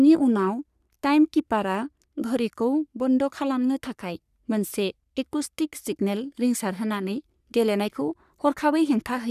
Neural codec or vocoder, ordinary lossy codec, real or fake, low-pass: codec, 44.1 kHz, 3.4 kbps, Pupu-Codec; none; fake; 14.4 kHz